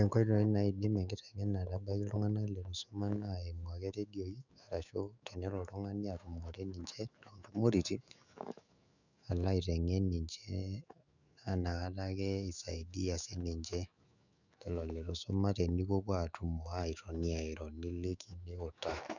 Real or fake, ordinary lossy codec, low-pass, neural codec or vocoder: fake; none; 7.2 kHz; autoencoder, 48 kHz, 128 numbers a frame, DAC-VAE, trained on Japanese speech